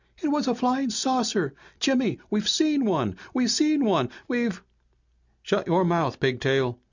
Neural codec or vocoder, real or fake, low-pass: none; real; 7.2 kHz